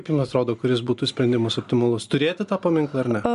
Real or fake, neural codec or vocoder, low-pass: real; none; 10.8 kHz